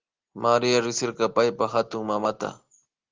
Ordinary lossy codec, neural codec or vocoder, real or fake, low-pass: Opus, 16 kbps; none; real; 7.2 kHz